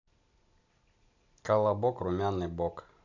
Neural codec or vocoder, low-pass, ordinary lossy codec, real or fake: none; 7.2 kHz; none; real